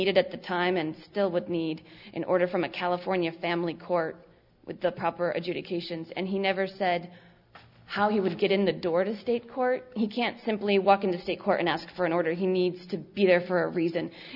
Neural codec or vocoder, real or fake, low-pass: none; real; 5.4 kHz